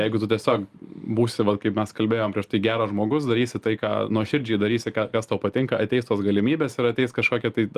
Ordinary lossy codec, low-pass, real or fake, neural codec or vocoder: Opus, 32 kbps; 14.4 kHz; real; none